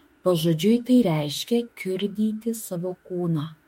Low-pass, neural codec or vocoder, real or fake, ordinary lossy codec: 19.8 kHz; autoencoder, 48 kHz, 32 numbers a frame, DAC-VAE, trained on Japanese speech; fake; MP3, 64 kbps